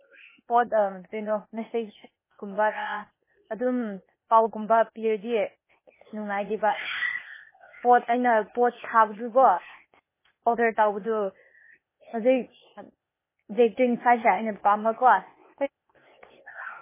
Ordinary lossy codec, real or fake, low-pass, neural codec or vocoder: MP3, 16 kbps; fake; 3.6 kHz; codec, 16 kHz, 0.8 kbps, ZipCodec